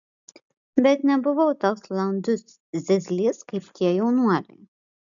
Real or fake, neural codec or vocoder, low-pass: real; none; 7.2 kHz